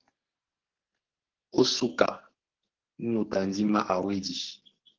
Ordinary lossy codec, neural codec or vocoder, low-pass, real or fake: Opus, 16 kbps; codec, 44.1 kHz, 2.6 kbps, SNAC; 7.2 kHz; fake